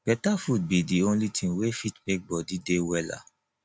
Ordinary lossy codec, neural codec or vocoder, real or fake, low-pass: none; none; real; none